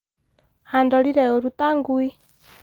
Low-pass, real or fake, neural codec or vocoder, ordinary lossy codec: 19.8 kHz; real; none; Opus, 24 kbps